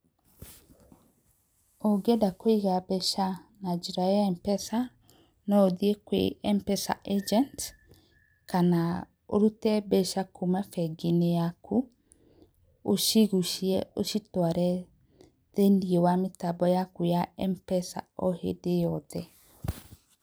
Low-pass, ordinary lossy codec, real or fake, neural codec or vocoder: none; none; fake; vocoder, 44.1 kHz, 128 mel bands every 256 samples, BigVGAN v2